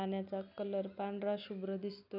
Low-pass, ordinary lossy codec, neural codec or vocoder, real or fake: 5.4 kHz; none; none; real